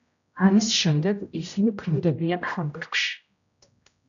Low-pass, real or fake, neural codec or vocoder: 7.2 kHz; fake; codec, 16 kHz, 0.5 kbps, X-Codec, HuBERT features, trained on general audio